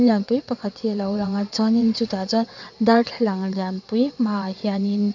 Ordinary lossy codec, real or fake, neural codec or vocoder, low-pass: none; fake; vocoder, 22.05 kHz, 80 mel bands, WaveNeXt; 7.2 kHz